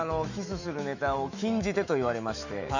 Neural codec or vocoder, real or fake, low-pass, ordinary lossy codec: autoencoder, 48 kHz, 128 numbers a frame, DAC-VAE, trained on Japanese speech; fake; 7.2 kHz; Opus, 64 kbps